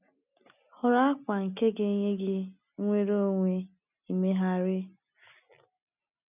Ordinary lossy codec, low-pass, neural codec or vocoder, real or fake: none; 3.6 kHz; none; real